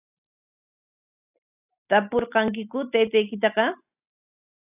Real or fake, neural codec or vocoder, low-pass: real; none; 3.6 kHz